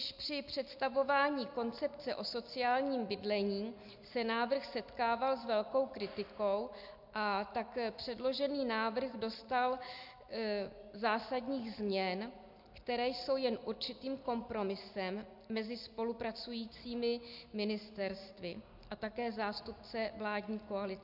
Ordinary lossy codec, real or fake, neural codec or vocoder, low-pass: MP3, 48 kbps; real; none; 5.4 kHz